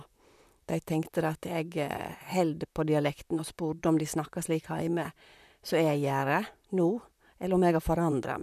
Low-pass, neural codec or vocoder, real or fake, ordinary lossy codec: 14.4 kHz; vocoder, 44.1 kHz, 128 mel bands, Pupu-Vocoder; fake; none